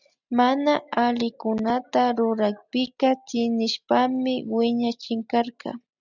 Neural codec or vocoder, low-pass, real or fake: none; 7.2 kHz; real